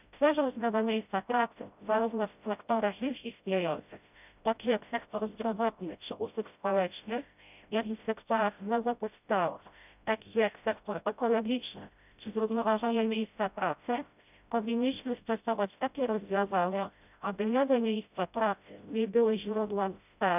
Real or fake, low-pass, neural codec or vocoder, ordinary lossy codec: fake; 3.6 kHz; codec, 16 kHz, 0.5 kbps, FreqCodec, smaller model; none